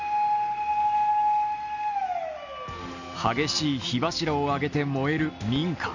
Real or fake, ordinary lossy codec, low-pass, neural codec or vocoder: real; none; 7.2 kHz; none